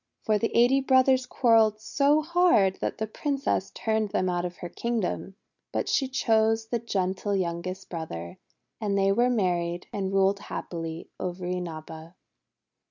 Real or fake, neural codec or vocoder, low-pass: real; none; 7.2 kHz